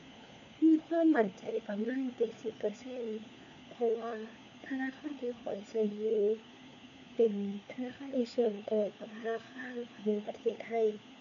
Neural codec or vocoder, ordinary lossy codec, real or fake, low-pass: codec, 16 kHz, 4 kbps, FunCodec, trained on LibriTTS, 50 frames a second; none; fake; 7.2 kHz